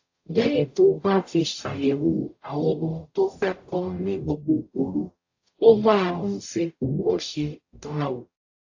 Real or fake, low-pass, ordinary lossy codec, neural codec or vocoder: fake; 7.2 kHz; AAC, 48 kbps; codec, 44.1 kHz, 0.9 kbps, DAC